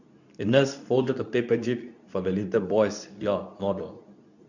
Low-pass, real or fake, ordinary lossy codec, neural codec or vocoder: 7.2 kHz; fake; none; codec, 24 kHz, 0.9 kbps, WavTokenizer, medium speech release version 2